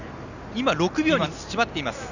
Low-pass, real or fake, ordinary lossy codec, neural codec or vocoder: 7.2 kHz; real; none; none